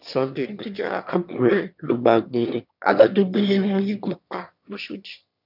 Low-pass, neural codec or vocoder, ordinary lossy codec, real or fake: 5.4 kHz; autoencoder, 22.05 kHz, a latent of 192 numbers a frame, VITS, trained on one speaker; none; fake